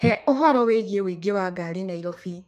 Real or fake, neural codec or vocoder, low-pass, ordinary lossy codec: fake; codec, 32 kHz, 1.9 kbps, SNAC; 14.4 kHz; none